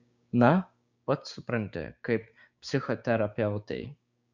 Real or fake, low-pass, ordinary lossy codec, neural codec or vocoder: fake; 7.2 kHz; Opus, 64 kbps; codec, 16 kHz, 6 kbps, DAC